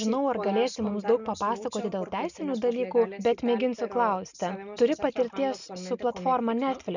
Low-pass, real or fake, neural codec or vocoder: 7.2 kHz; real; none